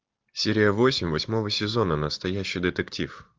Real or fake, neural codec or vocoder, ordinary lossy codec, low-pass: real; none; Opus, 32 kbps; 7.2 kHz